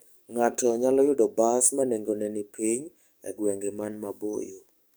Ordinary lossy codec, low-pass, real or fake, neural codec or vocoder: none; none; fake; codec, 44.1 kHz, 7.8 kbps, DAC